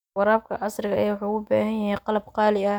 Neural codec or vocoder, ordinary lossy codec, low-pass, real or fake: none; none; 19.8 kHz; real